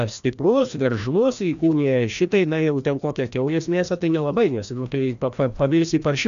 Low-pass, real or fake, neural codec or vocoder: 7.2 kHz; fake; codec, 16 kHz, 1 kbps, FreqCodec, larger model